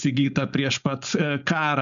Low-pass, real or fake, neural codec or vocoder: 7.2 kHz; fake; codec, 16 kHz, 4.8 kbps, FACodec